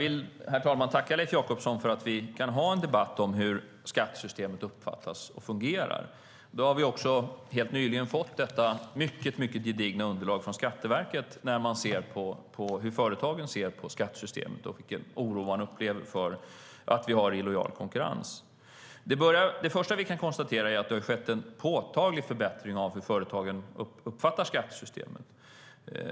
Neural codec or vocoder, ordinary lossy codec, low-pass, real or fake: none; none; none; real